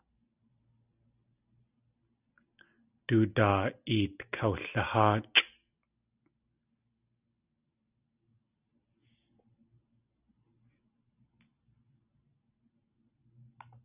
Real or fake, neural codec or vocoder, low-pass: real; none; 3.6 kHz